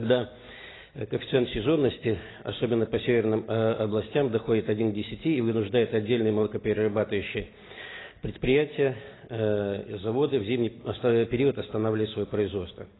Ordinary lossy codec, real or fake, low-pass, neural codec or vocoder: AAC, 16 kbps; real; 7.2 kHz; none